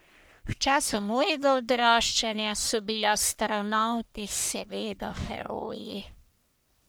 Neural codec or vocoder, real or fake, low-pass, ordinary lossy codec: codec, 44.1 kHz, 1.7 kbps, Pupu-Codec; fake; none; none